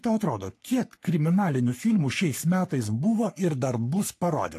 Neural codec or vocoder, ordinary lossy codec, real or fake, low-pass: codec, 44.1 kHz, 3.4 kbps, Pupu-Codec; AAC, 64 kbps; fake; 14.4 kHz